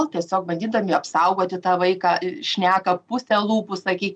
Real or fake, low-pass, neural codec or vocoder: real; 9.9 kHz; none